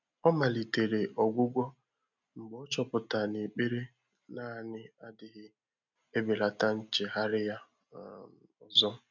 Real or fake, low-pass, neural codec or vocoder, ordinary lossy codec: real; 7.2 kHz; none; none